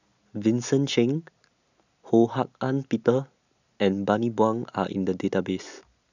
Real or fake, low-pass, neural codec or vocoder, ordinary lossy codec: real; 7.2 kHz; none; none